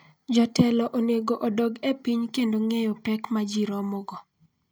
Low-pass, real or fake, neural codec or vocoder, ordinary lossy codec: none; real; none; none